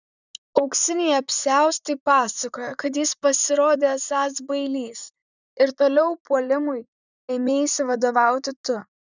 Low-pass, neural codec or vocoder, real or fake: 7.2 kHz; vocoder, 44.1 kHz, 128 mel bands, Pupu-Vocoder; fake